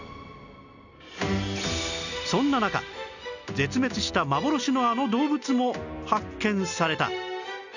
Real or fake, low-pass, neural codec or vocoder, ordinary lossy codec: real; 7.2 kHz; none; none